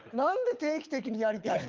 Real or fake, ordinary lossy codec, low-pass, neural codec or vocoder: fake; Opus, 24 kbps; 7.2 kHz; codec, 24 kHz, 6 kbps, HILCodec